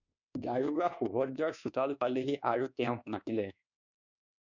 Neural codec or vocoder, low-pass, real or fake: codec, 24 kHz, 1.2 kbps, DualCodec; 7.2 kHz; fake